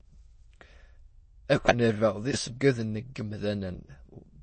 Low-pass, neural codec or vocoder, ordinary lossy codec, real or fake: 9.9 kHz; autoencoder, 22.05 kHz, a latent of 192 numbers a frame, VITS, trained on many speakers; MP3, 32 kbps; fake